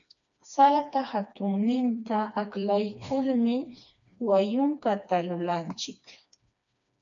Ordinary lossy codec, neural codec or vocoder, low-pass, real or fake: MP3, 96 kbps; codec, 16 kHz, 2 kbps, FreqCodec, smaller model; 7.2 kHz; fake